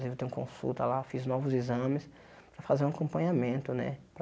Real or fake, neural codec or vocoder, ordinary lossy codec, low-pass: real; none; none; none